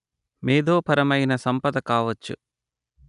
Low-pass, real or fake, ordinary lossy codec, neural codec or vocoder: 10.8 kHz; real; none; none